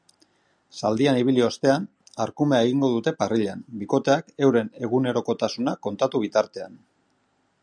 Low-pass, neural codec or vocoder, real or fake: 9.9 kHz; none; real